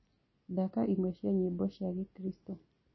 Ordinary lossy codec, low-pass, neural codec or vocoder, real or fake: MP3, 24 kbps; 7.2 kHz; none; real